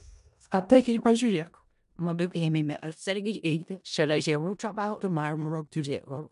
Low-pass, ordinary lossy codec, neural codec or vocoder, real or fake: 10.8 kHz; MP3, 96 kbps; codec, 16 kHz in and 24 kHz out, 0.4 kbps, LongCat-Audio-Codec, four codebook decoder; fake